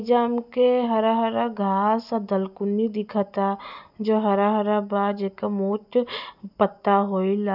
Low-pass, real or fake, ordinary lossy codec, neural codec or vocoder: 5.4 kHz; real; none; none